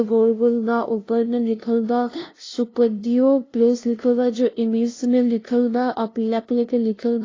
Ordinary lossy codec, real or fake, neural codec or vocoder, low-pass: AAC, 32 kbps; fake; codec, 16 kHz, 0.5 kbps, FunCodec, trained on LibriTTS, 25 frames a second; 7.2 kHz